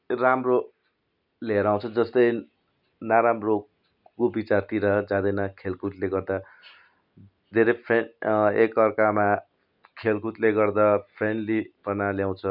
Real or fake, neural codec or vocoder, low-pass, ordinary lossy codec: real; none; 5.4 kHz; none